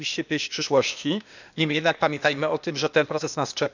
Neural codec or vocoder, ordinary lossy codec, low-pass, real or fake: codec, 16 kHz, 0.8 kbps, ZipCodec; none; 7.2 kHz; fake